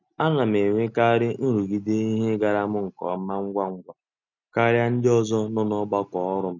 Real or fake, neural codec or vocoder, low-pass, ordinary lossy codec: real; none; 7.2 kHz; none